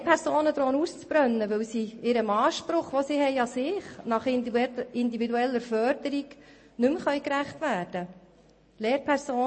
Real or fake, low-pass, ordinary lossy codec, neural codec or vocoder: real; 9.9 kHz; MP3, 32 kbps; none